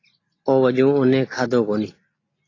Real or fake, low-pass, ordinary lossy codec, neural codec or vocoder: real; 7.2 kHz; AAC, 32 kbps; none